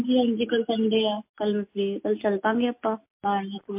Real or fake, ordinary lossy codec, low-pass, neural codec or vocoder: real; MP3, 32 kbps; 3.6 kHz; none